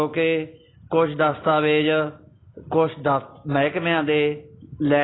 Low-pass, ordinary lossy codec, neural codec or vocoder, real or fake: 7.2 kHz; AAC, 16 kbps; none; real